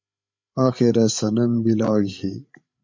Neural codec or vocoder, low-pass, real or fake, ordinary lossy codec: codec, 16 kHz, 8 kbps, FreqCodec, larger model; 7.2 kHz; fake; MP3, 48 kbps